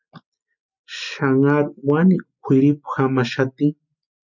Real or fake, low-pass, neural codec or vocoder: real; 7.2 kHz; none